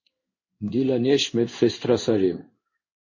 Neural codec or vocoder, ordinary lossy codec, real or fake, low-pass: codec, 16 kHz in and 24 kHz out, 1 kbps, XY-Tokenizer; MP3, 32 kbps; fake; 7.2 kHz